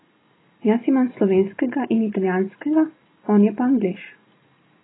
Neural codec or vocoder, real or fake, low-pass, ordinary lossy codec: none; real; 7.2 kHz; AAC, 16 kbps